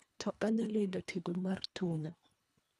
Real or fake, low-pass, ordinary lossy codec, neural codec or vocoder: fake; none; none; codec, 24 kHz, 1.5 kbps, HILCodec